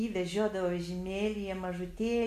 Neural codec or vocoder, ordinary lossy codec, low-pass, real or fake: none; MP3, 64 kbps; 14.4 kHz; real